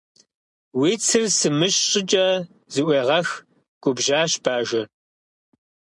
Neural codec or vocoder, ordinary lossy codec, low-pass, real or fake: none; MP3, 64 kbps; 10.8 kHz; real